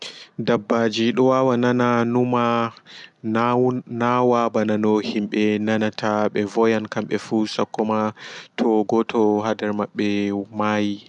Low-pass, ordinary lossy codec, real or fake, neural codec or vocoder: 10.8 kHz; none; real; none